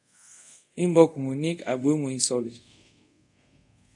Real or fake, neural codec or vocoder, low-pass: fake; codec, 24 kHz, 0.5 kbps, DualCodec; 10.8 kHz